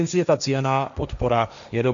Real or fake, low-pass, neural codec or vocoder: fake; 7.2 kHz; codec, 16 kHz, 1.1 kbps, Voila-Tokenizer